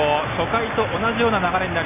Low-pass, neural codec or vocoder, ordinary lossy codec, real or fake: 3.6 kHz; none; none; real